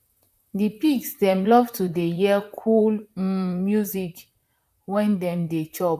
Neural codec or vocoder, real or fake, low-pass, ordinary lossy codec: vocoder, 44.1 kHz, 128 mel bands, Pupu-Vocoder; fake; 14.4 kHz; none